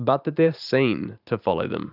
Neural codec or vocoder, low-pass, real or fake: vocoder, 44.1 kHz, 128 mel bands every 512 samples, BigVGAN v2; 5.4 kHz; fake